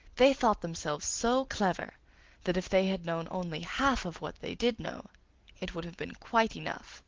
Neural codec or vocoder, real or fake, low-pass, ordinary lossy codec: none; real; 7.2 kHz; Opus, 16 kbps